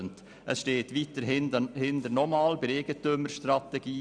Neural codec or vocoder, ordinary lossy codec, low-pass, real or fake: none; none; 9.9 kHz; real